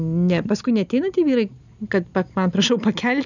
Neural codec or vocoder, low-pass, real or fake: none; 7.2 kHz; real